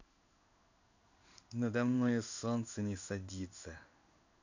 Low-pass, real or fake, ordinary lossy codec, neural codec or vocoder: 7.2 kHz; fake; none; codec, 16 kHz in and 24 kHz out, 1 kbps, XY-Tokenizer